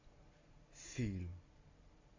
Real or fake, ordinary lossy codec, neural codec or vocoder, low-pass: real; AAC, 48 kbps; none; 7.2 kHz